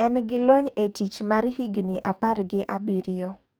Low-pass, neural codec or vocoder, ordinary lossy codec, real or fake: none; codec, 44.1 kHz, 2.6 kbps, DAC; none; fake